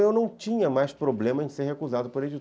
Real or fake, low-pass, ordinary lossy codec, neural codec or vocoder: real; none; none; none